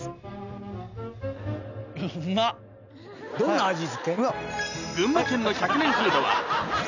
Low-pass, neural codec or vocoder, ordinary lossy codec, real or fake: 7.2 kHz; none; none; real